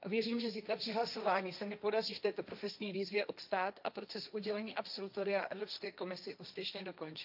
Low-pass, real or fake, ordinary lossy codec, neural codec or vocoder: 5.4 kHz; fake; none; codec, 16 kHz, 1.1 kbps, Voila-Tokenizer